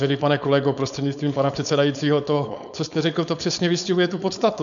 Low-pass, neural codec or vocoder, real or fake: 7.2 kHz; codec, 16 kHz, 4.8 kbps, FACodec; fake